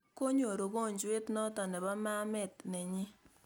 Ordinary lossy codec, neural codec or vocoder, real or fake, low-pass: none; none; real; none